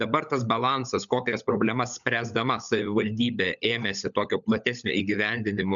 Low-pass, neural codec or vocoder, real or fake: 7.2 kHz; codec, 16 kHz, 16 kbps, FunCodec, trained on LibriTTS, 50 frames a second; fake